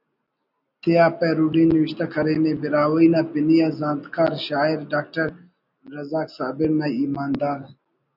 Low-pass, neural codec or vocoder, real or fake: 5.4 kHz; none; real